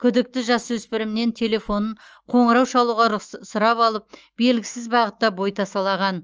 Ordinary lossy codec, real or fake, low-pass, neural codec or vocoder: Opus, 24 kbps; fake; 7.2 kHz; autoencoder, 48 kHz, 128 numbers a frame, DAC-VAE, trained on Japanese speech